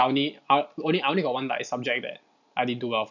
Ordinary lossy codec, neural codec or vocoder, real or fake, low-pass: none; none; real; 7.2 kHz